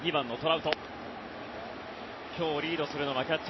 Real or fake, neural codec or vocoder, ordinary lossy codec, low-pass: real; none; MP3, 24 kbps; 7.2 kHz